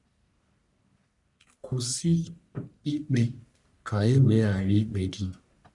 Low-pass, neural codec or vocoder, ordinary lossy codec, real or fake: 10.8 kHz; codec, 44.1 kHz, 1.7 kbps, Pupu-Codec; none; fake